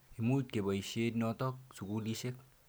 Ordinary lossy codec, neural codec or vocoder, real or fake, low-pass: none; none; real; none